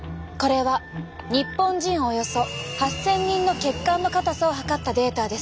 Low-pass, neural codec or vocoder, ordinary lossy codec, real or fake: none; none; none; real